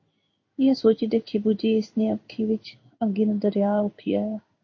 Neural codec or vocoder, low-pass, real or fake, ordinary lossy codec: codec, 16 kHz in and 24 kHz out, 1 kbps, XY-Tokenizer; 7.2 kHz; fake; MP3, 32 kbps